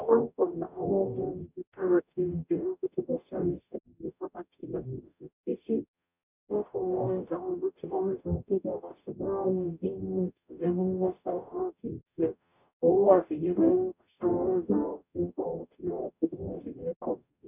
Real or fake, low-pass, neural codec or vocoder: fake; 3.6 kHz; codec, 44.1 kHz, 0.9 kbps, DAC